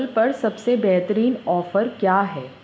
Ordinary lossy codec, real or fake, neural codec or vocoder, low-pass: none; real; none; none